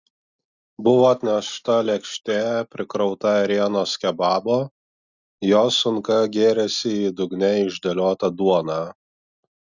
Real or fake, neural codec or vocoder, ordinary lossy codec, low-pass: real; none; Opus, 64 kbps; 7.2 kHz